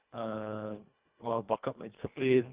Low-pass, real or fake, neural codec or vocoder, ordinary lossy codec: 3.6 kHz; fake; codec, 24 kHz, 1.5 kbps, HILCodec; Opus, 24 kbps